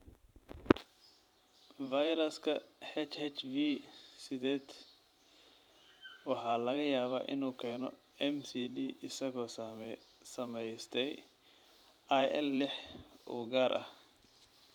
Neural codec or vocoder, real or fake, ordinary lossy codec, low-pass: vocoder, 44.1 kHz, 128 mel bands every 512 samples, BigVGAN v2; fake; none; 19.8 kHz